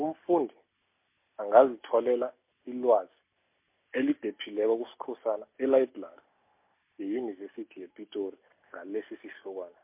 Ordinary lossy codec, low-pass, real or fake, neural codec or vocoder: MP3, 24 kbps; 3.6 kHz; real; none